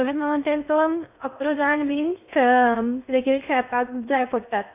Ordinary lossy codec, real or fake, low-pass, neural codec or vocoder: none; fake; 3.6 kHz; codec, 16 kHz in and 24 kHz out, 0.6 kbps, FocalCodec, streaming, 2048 codes